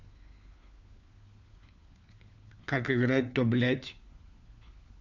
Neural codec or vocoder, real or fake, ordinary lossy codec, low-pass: codec, 16 kHz, 4 kbps, FunCodec, trained on LibriTTS, 50 frames a second; fake; none; 7.2 kHz